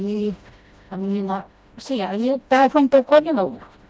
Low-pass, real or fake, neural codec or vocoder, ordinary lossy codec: none; fake; codec, 16 kHz, 1 kbps, FreqCodec, smaller model; none